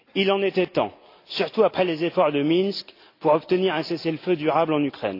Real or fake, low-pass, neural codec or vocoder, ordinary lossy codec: real; 5.4 kHz; none; AAC, 32 kbps